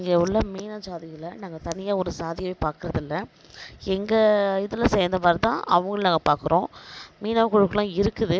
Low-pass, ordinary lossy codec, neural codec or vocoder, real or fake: none; none; none; real